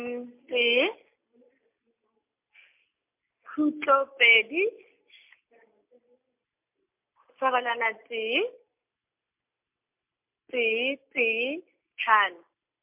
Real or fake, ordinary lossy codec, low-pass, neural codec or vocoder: real; MP3, 32 kbps; 3.6 kHz; none